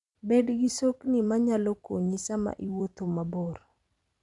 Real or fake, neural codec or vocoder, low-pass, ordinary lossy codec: fake; vocoder, 44.1 kHz, 128 mel bands every 256 samples, BigVGAN v2; 10.8 kHz; none